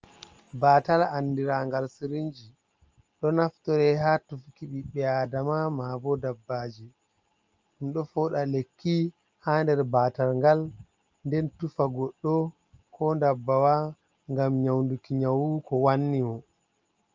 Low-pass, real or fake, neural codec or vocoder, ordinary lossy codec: 7.2 kHz; real; none; Opus, 24 kbps